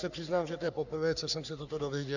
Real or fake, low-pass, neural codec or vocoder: fake; 7.2 kHz; codec, 44.1 kHz, 3.4 kbps, Pupu-Codec